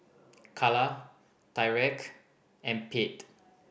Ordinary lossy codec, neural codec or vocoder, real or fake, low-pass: none; none; real; none